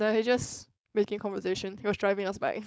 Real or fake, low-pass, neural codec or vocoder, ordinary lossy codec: fake; none; codec, 16 kHz, 4.8 kbps, FACodec; none